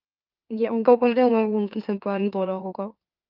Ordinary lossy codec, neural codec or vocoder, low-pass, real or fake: Opus, 24 kbps; autoencoder, 44.1 kHz, a latent of 192 numbers a frame, MeloTTS; 5.4 kHz; fake